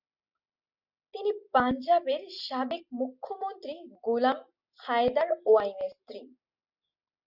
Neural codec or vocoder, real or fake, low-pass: none; real; 5.4 kHz